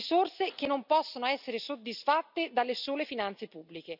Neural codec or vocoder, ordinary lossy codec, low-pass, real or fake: none; none; 5.4 kHz; real